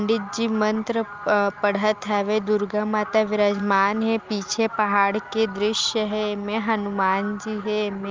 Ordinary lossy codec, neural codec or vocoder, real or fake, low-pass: Opus, 32 kbps; none; real; 7.2 kHz